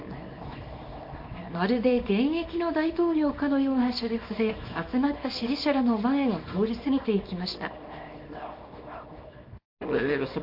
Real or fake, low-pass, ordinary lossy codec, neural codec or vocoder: fake; 5.4 kHz; MP3, 32 kbps; codec, 24 kHz, 0.9 kbps, WavTokenizer, small release